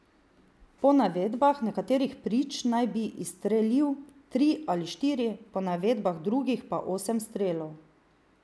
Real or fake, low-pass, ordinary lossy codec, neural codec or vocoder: real; none; none; none